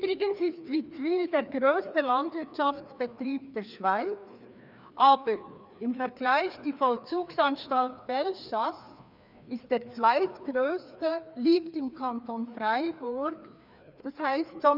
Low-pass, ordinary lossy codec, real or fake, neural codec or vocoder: 5.4 kHz; none; fake; codec, 16 kHz, 2 kbps, FreqCodec, larger model